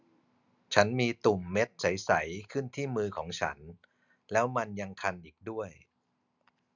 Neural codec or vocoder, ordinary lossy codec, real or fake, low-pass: none; none; real; 7.2 kHz